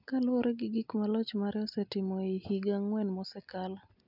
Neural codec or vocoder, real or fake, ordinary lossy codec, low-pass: none; real; none; 5.4 kHz